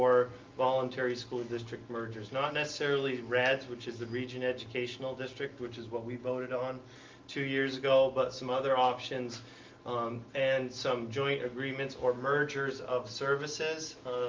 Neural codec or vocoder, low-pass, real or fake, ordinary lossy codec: none; 7.2 kHz; real; Opus, 24 kbps